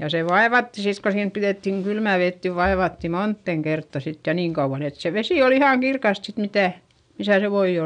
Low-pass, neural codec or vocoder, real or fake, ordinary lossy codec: 9.9 kHz; none; real; none